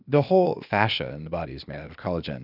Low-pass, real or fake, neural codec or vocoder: 5.4 kHz; fake; codec, 16 kHz, 0.8 kbps, ZipCodec